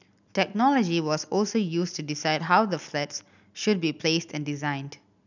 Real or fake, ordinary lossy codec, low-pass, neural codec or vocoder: real; none; 7.2 kHz; none